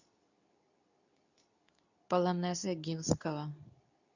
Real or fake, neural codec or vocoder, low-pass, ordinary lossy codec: fake; codec, 24 kHz, 0.9 kbps, WavTokenizer, medium speech release version 2; 7.2 kHz; none